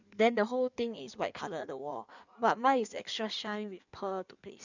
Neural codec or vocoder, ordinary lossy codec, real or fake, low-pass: codec, 16 kHz in and 24 kHz out, 1.1 kbps, FireRedTTS-2 codec; none; fake; 7.2 kHz